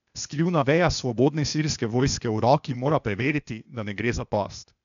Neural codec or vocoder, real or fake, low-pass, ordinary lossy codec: codec, 16 kHz, 0.8 kbps, ZipCodec; fake; 7.2 kHz; none